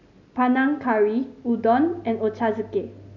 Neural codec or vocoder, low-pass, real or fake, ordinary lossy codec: none; 7.2 kHz; real; none